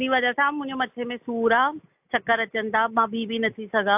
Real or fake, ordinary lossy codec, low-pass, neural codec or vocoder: real; AAC, 32 kbps; 3.6 kHz; none